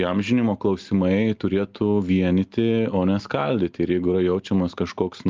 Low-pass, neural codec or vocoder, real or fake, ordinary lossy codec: 7.2 kHz; none; real; Opus, 32 kbps